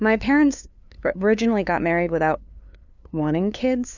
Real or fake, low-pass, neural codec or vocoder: fake; 7.2 kHz; codec, 16 kHz, 2 kbps, FunCodec, trained on LibriTTS, 25 frames a second